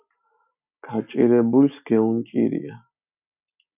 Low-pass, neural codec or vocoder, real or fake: 3.6 kHz; none; real